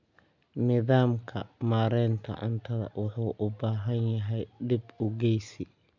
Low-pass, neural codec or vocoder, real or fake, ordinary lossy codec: 7.2 kHz; none; real; none